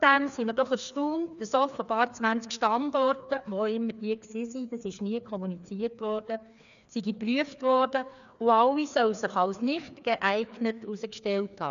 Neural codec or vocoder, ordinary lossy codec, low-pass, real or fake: codec, 16 kHz, 2 kbps, FreqCodec, larger model; none; 7.2 kHz; fake